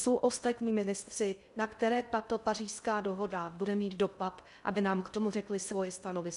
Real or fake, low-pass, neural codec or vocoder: fake; 10.8 kHz; codec, 16 kHz in and 24 kHz out, 0.6 kbps, FocalCodec, streaming, 2048 codes